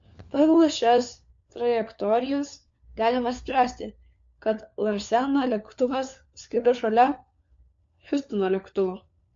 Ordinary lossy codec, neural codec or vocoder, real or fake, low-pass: MP3, 48 kbps; codec, 16 kHz, 4 kbps, FunCodec, trained on LibriTTS, 50 frames a second; fake; 7.2 kHz